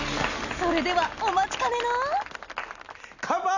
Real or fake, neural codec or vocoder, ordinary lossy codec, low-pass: real; none; none; 7.2 kHz